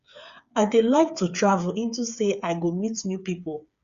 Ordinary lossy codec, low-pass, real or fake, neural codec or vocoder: Opus, 64 kbps; 7.2 kHz; fake; codec, 16 kHz, 8 kbps, FreqCodec, smaller model